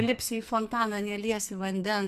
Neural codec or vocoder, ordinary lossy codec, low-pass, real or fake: codec, 44.1 kHz, 2.6 kbps, SNAC; MP3, 96 kbps; 14.4 kHz; fake